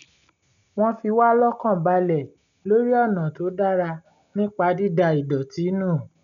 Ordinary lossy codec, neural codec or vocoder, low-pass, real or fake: none; none; 7.2 kHz; real